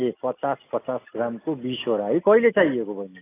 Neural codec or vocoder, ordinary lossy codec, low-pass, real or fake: none; AAC, 24 kbps; 3.6 kHz; real